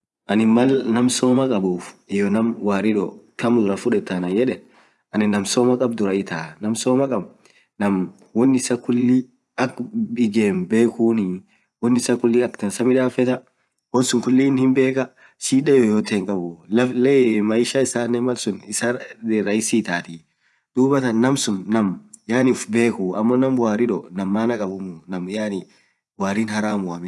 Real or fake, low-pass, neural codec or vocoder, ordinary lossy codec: fake; none; vocoder, 24 kHz, 100 mel bands, Vocos; none